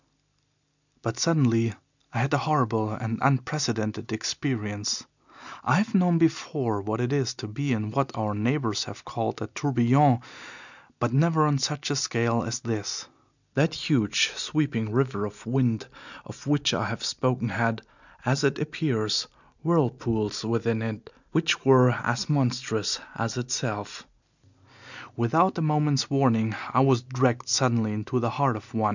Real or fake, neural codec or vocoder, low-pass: real; none; 7.2 kHz